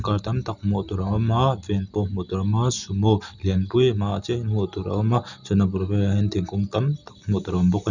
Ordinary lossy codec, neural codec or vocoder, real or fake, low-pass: none; none; real; 7.2 kHz